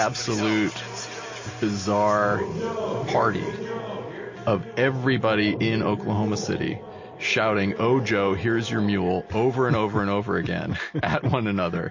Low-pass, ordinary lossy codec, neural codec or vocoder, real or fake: 7.2 kHz; MP3, 32 kbps; none; real